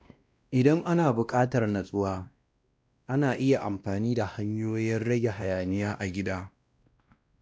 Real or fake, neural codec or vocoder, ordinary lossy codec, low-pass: fake; codec, 16 kHz, 1 kbps, X-Codec, WavLM features, trained on Multilingual LibriSpeech; none; none